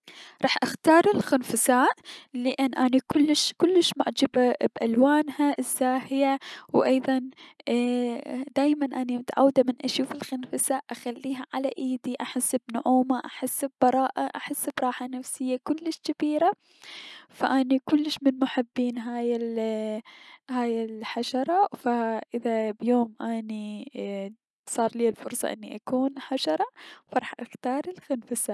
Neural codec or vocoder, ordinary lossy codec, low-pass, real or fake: none; none; none; real